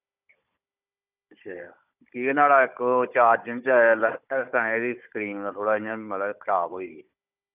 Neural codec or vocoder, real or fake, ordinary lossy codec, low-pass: codec, 16 kHz, 16 kbps, FunCodec, trained on Chinese and English, 50 frames a second; fake; none; 3.6 kHz